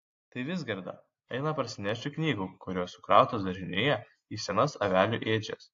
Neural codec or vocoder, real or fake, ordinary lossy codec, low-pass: none; real; AAC, 48 kbps; 7.2 kHz